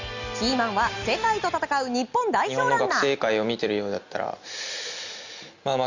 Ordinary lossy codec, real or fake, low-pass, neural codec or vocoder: Opus, 64 kbps; real; 7.2 kHz; none